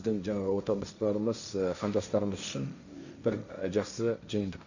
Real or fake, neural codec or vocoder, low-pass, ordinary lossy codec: fake; codec, 16 kHz, 1.1 kbps, Voila-Tokenizer; 7.2 kHz; none